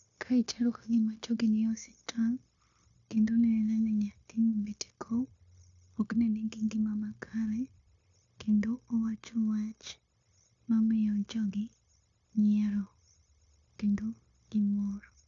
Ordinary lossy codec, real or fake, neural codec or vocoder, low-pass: AAC, 64 kbps; fake; codec, 16 kHz, 0.9 kbps, LongCat-Audio-Codec; 7.2 kHz